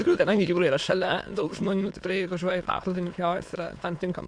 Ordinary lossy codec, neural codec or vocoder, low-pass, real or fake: MP3, 64 kbps; autoencoder, 22.05 kHz, a latent of 192 numbers a frame, VITS, trained on many speakers; 9.9 kHz; fake